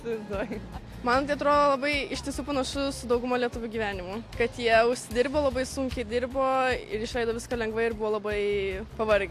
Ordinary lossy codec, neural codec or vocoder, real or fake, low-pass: AAC, 64 kbps; none; real; 14.4 kHz